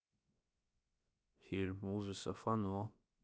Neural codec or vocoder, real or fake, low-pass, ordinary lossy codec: codec, 16 kHz, 0.7 kbps, FocalCodec; fake; none; none